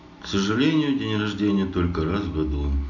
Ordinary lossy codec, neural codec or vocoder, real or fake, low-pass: none; none; real; 7.2 kHz